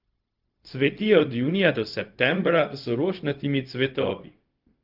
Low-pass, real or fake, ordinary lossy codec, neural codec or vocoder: 5.4 kHz; fake; Opus, 24 kbps; codec, 16 kHz, 0.4 kbps, LongCat-Audio-Codec